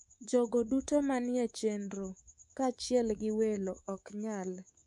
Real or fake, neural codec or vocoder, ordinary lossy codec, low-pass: fake; codec, 24 kHz, 3.1 kbps, DualCodec; MP3, 64 kbps; 10.8 kHz